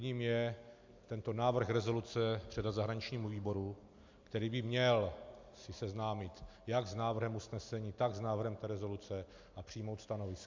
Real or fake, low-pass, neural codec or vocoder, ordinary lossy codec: real; 7.2 kHz; none; MP3, 64 kbps